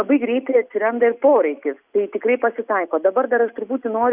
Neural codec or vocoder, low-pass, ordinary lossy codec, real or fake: none; 3.6 kHz; Opus, 64 kbps; real